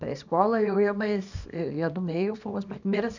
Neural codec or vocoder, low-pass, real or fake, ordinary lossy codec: codec, 24 kHz, 0.9 kbps, WavTokenizer, small release; 7.2 kHz; fake; none